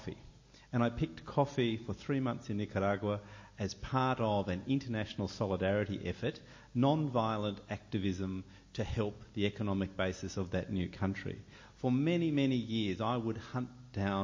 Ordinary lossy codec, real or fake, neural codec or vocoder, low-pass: MP3, 32 kbps; real; none; 7.2 kHz